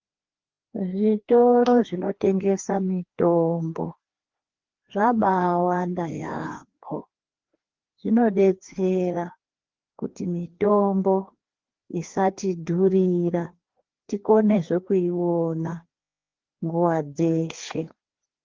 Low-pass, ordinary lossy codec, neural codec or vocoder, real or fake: 7.2 kHz; Opus, 16 kbps; codec, 16 kHz, 2 kbps, FreqCodec, larger model; fake